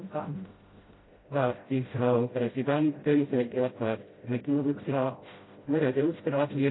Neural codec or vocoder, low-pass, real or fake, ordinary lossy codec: codec, 16 kHz, 0.5 kbps, FreqCodec, smaller model; 7.2 kHz; fake; AAC, 16 kbps